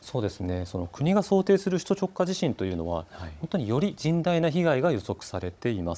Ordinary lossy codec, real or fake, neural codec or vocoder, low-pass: none; fake; codec, 16 kHz, 16 kbps, FunCodec, trained on Chinese and English, 50 frames a second; none